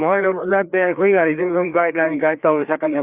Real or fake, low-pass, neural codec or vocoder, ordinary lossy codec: fake; 3.6 kHz; codec, 16 kHz, 2 kbps, FreqCodec, larger model; Opus, 64 kbps